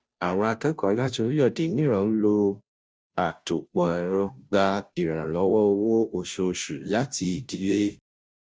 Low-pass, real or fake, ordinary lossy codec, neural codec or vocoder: none; fake; none; codec, 16 kHz, 0.5 kbps, FunCodec, trained on Chinese and English, 25 frames a second